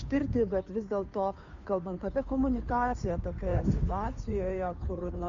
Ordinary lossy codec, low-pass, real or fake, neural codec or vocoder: MP3, 64 kbps; 7.2 kHz; fake; codec, 16 kHz, 2 kbps, FunCodec, trained on Chinese and English, 25 frames a second